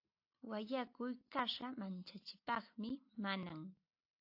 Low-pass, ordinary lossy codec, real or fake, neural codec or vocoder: 5.4 kHz; AAC, 48 kbps; real; none